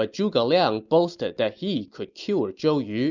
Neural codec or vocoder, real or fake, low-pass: none; real; 7.2 kHz